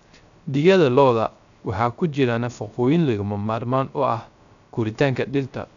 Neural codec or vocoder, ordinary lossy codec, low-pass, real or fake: codec, 16 kHz, 0.3 kbps, FocalCodec; none; 7.2 kHz; fake